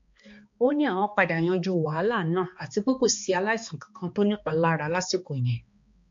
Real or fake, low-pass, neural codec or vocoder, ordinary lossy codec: fake; 7.2 kHz; codec, 16 kHz, 2 kbps, X-Codec, HuBERT features, trained on balanced general audio; MP3, 48 kbps